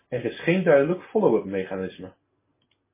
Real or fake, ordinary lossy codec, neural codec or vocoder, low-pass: real; MP3, 16 kbps; none; 3.6 kHz